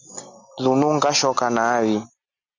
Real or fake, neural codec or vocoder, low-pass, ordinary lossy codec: real; none; 7.2 kHz; MP3, 64 kbps